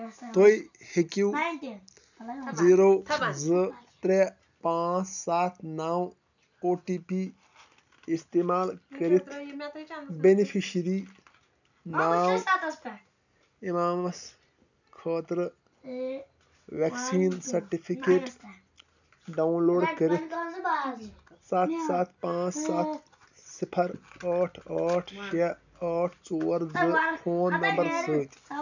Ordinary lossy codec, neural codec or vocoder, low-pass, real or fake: none; none; 7.2 kHz; real